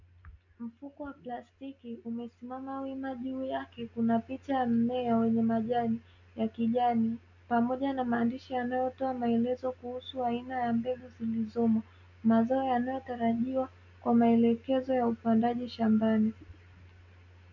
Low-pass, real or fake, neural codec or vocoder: 7.2 kHz; real; none